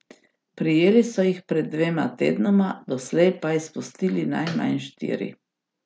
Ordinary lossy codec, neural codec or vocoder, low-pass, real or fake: none; none; none; real